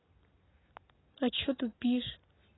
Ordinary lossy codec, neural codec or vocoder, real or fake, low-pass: AAC, 16 kbps; none; real; 7.2 kHz